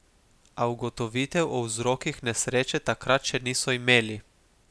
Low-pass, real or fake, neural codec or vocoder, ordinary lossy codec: none; real; none; none